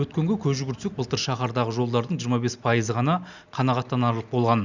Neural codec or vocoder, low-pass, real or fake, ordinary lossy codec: none; 7.2 kHz; real; Opus, 64 kbps